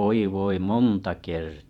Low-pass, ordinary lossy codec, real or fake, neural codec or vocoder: 19.8 kHz; none; fake; vocoder, 44.1 kHz, 128 mel bands every 512 samples, BigVGAN v2